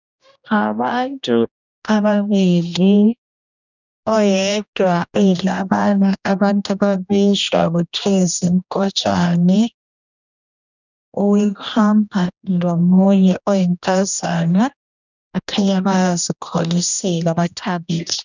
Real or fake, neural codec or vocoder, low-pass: fake; codec, 16 kHz, 1 kbps, X-Codec, HuBERT features, trained on general audio; 7.2 kHz